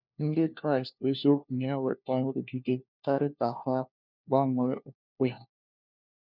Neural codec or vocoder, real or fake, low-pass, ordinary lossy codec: codec, 16 kHz, 1 kbps, FunCodec, trained on LibriTTS, 50 frames a second; fake; 5.4 kHz; none